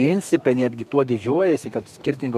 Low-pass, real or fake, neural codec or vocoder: 14.4 kHz; fake; codec, 32 kHz, 1.9 kbps, SNAC